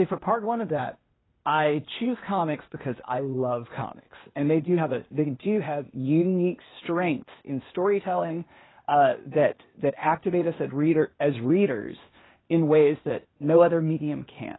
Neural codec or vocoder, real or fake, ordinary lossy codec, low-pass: codec, 16 kHz, 0.8 kbps, ZipCodec; fake; AAC, 16 kbps; 7.2 kHz